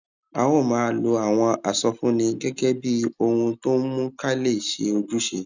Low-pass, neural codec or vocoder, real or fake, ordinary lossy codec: 7.2 kHz; none; real; none